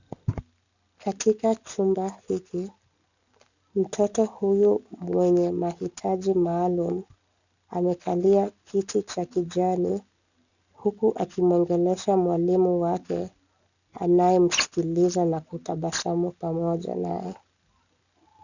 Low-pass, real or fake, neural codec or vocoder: 7.2 kHz; real; none